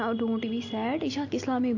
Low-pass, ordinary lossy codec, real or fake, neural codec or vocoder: 7.2 kHz; AAC, 48 kbps; real; none